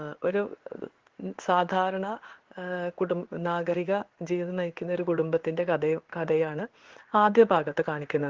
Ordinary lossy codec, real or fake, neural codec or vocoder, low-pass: Opus, 16 kbps; fake; codec, 16 kHz in and 24 kHz out, 1 kbps, XY-Tokenizer; 7.2 kHz